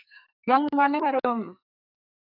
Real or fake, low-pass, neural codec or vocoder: fake; 5.4 kHz; codec, 44.1 kHz, 2.6 kbps, SNAC